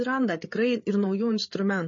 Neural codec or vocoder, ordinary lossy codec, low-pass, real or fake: codec, 16 kHz, 8 kbps, FreqCodec, larger model; MP3, 32 kbps; 7.2 kHz; fake